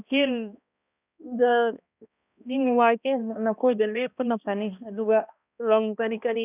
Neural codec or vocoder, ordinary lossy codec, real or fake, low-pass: codec, 16 kHz, 1 kbps, X-Codec, HuBERT features, trained on balanced general audio; none; fake; 3.6 kHz